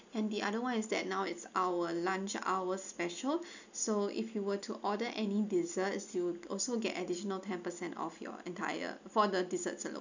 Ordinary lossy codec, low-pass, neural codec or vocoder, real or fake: none; 7.2 kHz; none; real